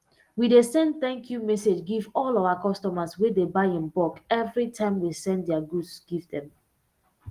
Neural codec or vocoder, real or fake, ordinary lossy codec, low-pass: none; real; Opus, 24 kbps; 14.4 kHz